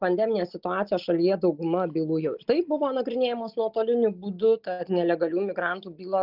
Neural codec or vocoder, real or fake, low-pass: none; real; 5.4 kHz